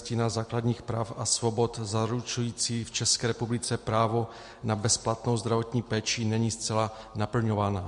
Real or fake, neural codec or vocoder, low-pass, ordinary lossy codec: real; none; 10.8 kHz; MP3, 48 kbps